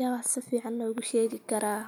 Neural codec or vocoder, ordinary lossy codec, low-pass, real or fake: none; none; none; real